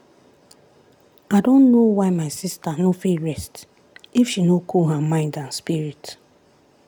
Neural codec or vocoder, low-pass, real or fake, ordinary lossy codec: none; 19.8 kHz; real; none